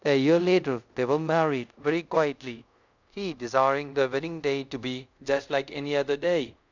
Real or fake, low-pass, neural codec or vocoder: fake; 7.2 kHz; codec, 24 kHz, 0.5 kbps, DualCodec